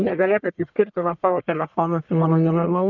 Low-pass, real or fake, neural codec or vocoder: 7.2 kHz; fake; codec, 44.1 kHz, 1.7 kbps, Pupu-Codec